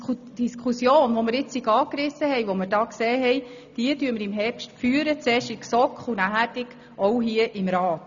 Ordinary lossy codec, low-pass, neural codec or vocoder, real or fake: none; 7.2 kHz; none; real